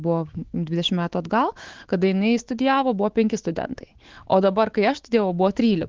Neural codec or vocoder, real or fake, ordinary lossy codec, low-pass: autoencoder, 48 kHz, 128 numbers a frame, DAC-VAE, trained on Japanese speech; fake; Opus, 16 kbps; 7.2 kHz